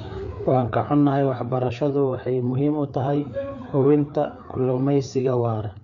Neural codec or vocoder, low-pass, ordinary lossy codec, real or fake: codec, 16 kHz, 4 kbps, FreqCodec, larger model; 7.2 kHz; MP3, 96 kbps; fake